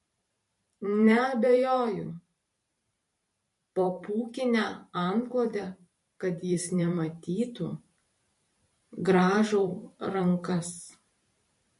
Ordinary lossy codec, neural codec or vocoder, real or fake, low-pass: MP3, 48 kbps; vocoder, 44.1 kHz, 128 mel bands every 512 samples, BigVGAN v2; fake; 14.4 kHz